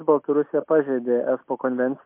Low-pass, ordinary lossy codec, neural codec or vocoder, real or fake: 3.6 kHz; MP3, 32 kbps; none; real